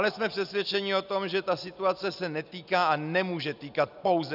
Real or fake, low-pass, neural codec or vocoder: real; 5.4 kHz; none